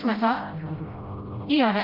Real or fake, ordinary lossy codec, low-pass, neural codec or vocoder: fake; Opus, 32 kbps; 5.4 kHz; codec, 16 kHz, 0.5 kbps, FreqCodec, smaller model